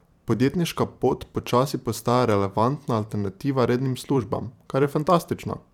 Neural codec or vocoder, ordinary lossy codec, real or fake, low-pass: vocoder, 44.1 kHz, 128 mel bands every 512 samples, BigVGAN v2; none; fake; 19.8 kHz